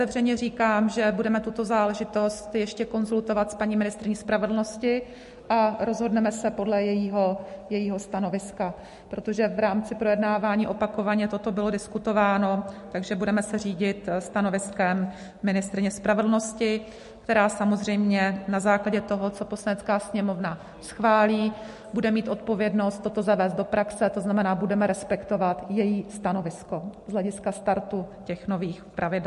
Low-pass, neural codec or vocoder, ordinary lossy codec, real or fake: 14.4 kHz; none; MP3, 48 kbps; real